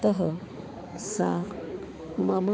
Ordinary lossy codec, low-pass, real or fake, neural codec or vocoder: none; none; fake; codec, 16 kHz, 4 kbps, X-Codec, HuBERT features, trained on balanced general audio